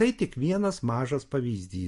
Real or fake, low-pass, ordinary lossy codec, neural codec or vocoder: real; 14.4 kHz; MP3, 48 kbps; none